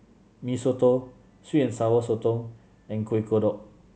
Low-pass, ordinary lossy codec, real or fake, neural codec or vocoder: none; none; real; none